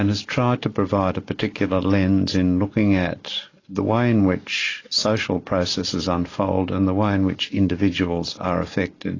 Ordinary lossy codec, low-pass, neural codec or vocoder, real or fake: AAC, 32 kbps; 7.2 kHz; none; real